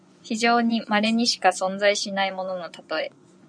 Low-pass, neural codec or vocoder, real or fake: 9.9 kHz; vocoder, 24 kHz, 100 mel bands, Vocos; fake